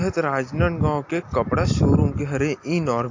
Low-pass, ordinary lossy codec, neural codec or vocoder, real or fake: 7.2 kHz; AAC, 48 kbps; none; real